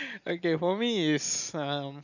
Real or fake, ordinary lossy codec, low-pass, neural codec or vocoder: real; none; 7.2 kHz; none